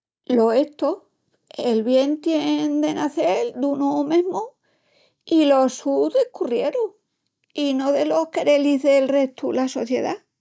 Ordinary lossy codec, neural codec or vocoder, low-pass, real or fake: none; none; none; real